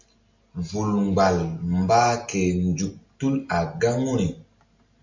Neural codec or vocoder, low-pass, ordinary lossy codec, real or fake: none; 7.2 kHz; MP3, 64 kbps; real